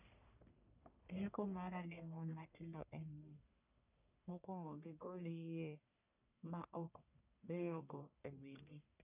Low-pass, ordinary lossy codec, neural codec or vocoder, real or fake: 3.6 kHz; none; codec, 44.1 kHz, 1.7 kbps, Pupu-Codec; fake